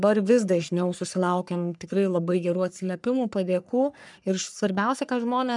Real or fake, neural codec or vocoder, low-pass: fake; codec, 44.1 kHz, 3.4 kbps, Pupu-Codec; 10.8 kHz